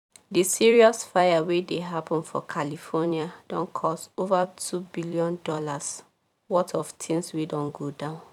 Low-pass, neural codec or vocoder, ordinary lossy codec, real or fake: 19.8 kHz; vocoder, 44.1 kHz, 128 mel bands every 256 samples, BigVGAN v2; none; fake